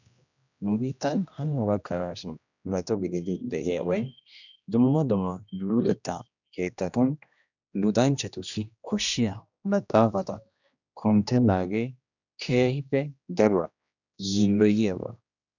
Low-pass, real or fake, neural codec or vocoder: 7.2 kHz; fake; codec, 16 kHz, 1 kbps, X-Codec, HuBERT features, trained on general audio